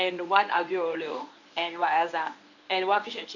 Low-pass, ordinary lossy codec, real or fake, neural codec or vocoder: 7.2 kHz; none; fake; codec, 24 kHz, 0.9 kbps, WavTokenizer, medium speech release version 1